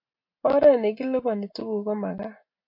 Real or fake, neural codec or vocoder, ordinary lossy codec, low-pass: real; none; MP3, 32 kbps; 5.4 kHz